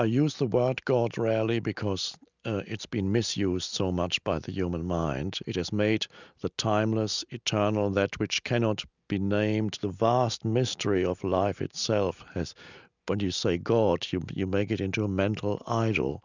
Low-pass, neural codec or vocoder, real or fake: 7.2 kHz; none; real